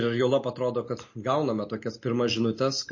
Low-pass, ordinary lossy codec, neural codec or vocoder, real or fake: 7.2 kHz; MP3, 32 kbps; none; real